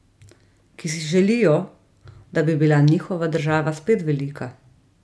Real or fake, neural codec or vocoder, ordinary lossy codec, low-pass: real; none; none; none